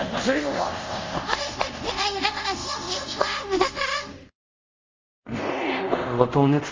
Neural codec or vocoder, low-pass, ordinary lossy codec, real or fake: codec, 24 kHz, 0.5 kbps, DualCodec; 7.2 kHz; Opus, 32 kbps; fake